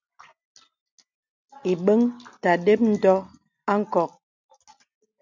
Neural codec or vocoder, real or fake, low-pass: none; real; 7.2 kHz